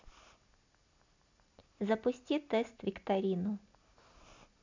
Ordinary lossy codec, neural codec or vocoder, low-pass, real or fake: MP3, 64 kbps; none; 7.2 kHz; real